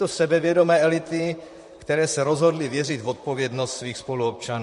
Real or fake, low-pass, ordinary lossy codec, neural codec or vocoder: fake; 14.4 kHz; MP3, 48 kbps; vocoder, 44.1 kHz, 128 mel bands, Pupu-Vocoder